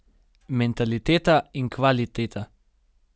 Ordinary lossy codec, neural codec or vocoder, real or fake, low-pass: none; none; real; none